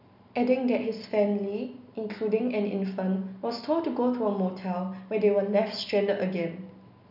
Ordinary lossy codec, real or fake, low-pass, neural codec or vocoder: none; real; 5.4 kHz; none